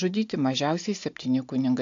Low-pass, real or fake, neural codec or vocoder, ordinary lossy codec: 7.2 kHz; real; none; AAC, 64 kbps